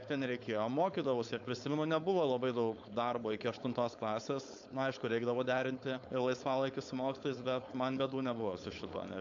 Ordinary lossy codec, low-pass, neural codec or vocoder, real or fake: AAC, 64 kbps; 7.2 kHz; codec, 16 kHz, 4.8 kbps, FACodec; fake